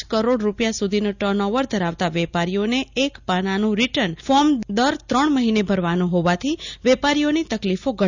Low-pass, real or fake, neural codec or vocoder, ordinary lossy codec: 7.2 kHz; real; none; none